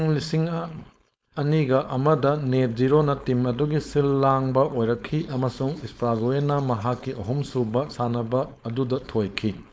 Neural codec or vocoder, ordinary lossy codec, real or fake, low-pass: codec, 16 kHz, 4.8 kbps, FACodec; none; fake; none